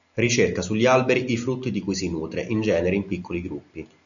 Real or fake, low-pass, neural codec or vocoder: real; 7.2 kHz; none